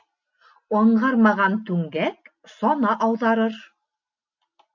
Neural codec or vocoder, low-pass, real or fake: none; 7.2 kHz; real